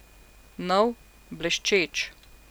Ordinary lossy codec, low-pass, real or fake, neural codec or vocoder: none; none; real; none